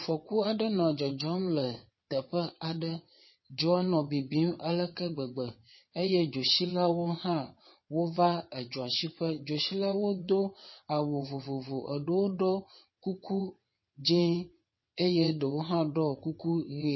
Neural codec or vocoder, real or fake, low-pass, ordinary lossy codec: vocoder, 22.05 kHz, 80 mel bands, WaveNeXt; fake; 7.2 kHz; MP3, 24 kbps